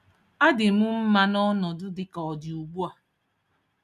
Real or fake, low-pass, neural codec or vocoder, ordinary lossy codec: real; 14.4 kHz; none; none